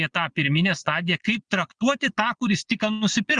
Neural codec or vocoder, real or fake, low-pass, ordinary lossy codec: vocoder, 22.05 kHz, 80 mel bands, Vocos; fake; 9.9 kHz; Opus, 64 kbps